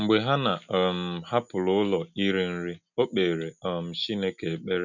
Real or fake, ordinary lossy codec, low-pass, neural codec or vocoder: real; none; 7.2 kHz; none